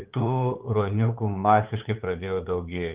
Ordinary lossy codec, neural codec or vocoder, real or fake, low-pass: Opus, 24 kbps; codec, 16 kHz, 8 kbps, FunCodec, trained on LibriTTS, 25 frames a second; fake; 3.6 kHz